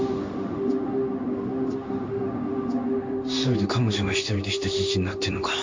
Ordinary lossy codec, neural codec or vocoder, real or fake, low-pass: MP3, 48 kbps; codec, 16 kHz in and 24 kHz out, 1 kbps, XY-Tokenizer; fake; 7.2 kHz